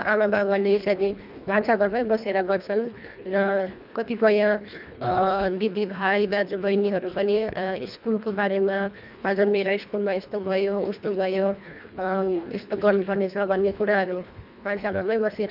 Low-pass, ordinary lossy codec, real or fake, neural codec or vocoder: 5.4 kHz; none; fake; codec, 24 kHz, 1.5 kbps, HILCodec